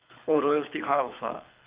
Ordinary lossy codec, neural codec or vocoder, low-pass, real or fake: Opus, 24 kbps; codec, 24 kHz, 6 kbps, HILCodec; 3.6 kHz; fake